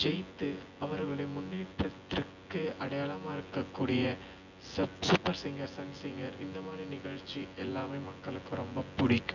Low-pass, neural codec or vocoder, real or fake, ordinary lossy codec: 7.2 kHz; vocoder, 24 kHz, 100 mel bands, Vocos; fake; none